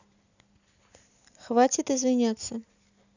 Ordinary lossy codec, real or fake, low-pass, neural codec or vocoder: none; real; 7.2 kHz; none